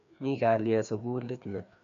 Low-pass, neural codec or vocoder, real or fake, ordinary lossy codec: 7.2 kHz; codec, 16 kHz, 2 kbps, FreqCodec, larger model; fake; none